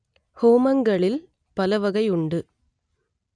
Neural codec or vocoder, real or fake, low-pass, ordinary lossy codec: none; real; 9.9 kHz; none